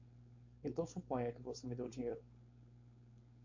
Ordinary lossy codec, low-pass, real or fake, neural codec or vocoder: MP3, 48 kbps; 7.2 kHz; fake; codec, 16 kHz, 4.8 kbps, FACodec